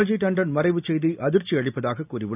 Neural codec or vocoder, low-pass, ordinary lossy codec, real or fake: none; 3.6 kHz; none; real